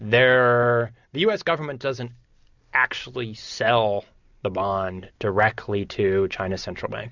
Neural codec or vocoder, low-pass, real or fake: none; 7.2 kHz; real